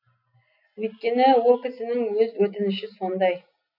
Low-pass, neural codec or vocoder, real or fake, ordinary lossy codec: 5.4 kHz; none; real; none